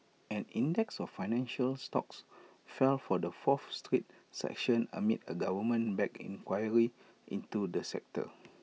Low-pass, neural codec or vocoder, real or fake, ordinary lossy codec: none; none; real; none